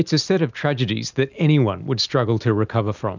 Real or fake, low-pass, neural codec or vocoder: real; 7.2 kHz; none